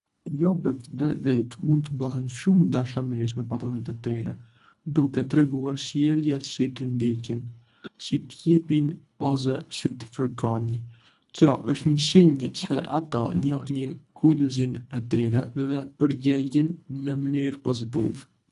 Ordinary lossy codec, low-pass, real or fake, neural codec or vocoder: none; 10.8 kHz; fake; codec, 24 kHz, 1.5 kbps, HILCodec